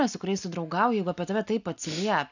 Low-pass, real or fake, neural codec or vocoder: 7.2 kHz; real; none